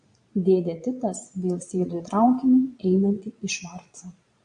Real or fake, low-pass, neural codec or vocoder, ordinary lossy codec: fake; 9.9 kHz; vocoder, 22.05 kHz, 80 mel bands, Vocos; MP3, 48 kbps